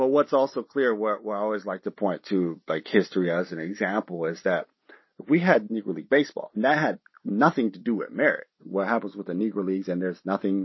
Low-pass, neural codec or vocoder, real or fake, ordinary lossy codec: 7.2 kHz; none; real; MP3, 24 kbps